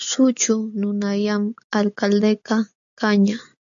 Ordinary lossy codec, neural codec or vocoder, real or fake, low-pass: AAC, 64 kbps; none; real; 7.2 kHz